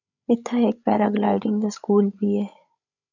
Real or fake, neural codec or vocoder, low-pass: fake; codec, 16 kHz, 16 kbps, FreqCodec, larger model; 7.2 kHz